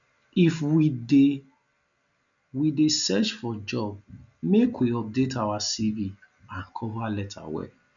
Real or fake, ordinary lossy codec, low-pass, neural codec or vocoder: real; none; 7.2 kHz; none